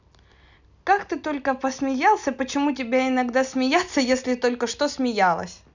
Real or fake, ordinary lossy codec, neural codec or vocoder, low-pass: real; none; none; 7.2 kHz